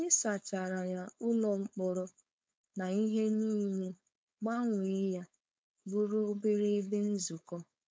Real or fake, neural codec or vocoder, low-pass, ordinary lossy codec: fake; codec, 16 kHz, 4.8 kbps, FACodec; none; none